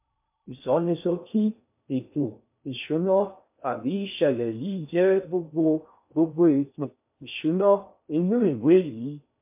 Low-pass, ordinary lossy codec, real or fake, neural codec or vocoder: 3.6 kHz; MP3, 32 kbps; fake; codec, 16 kHz in and 24 kHz out, 0.6 kbps, FocalCodec, streaming, 2048 codes